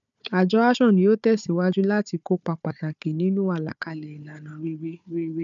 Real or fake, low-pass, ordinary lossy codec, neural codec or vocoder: fake; 7.2 kHz; none; codec, 16 kHz, 4 kbps, FunCodec, trained on Chinese and English, 50 frames a second